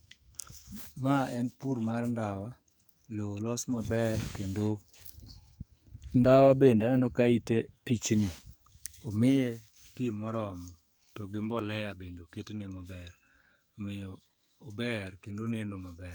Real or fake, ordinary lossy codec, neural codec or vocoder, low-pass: fake; none; codec, 44.1 kHz, 2.6 kbps, SNAC; none